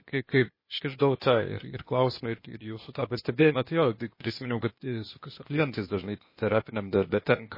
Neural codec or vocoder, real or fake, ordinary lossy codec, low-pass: codec, 16 kHz, 0.8 kbps, ZipCodec; fake; MP3, 24 kbps; 5.4 kHz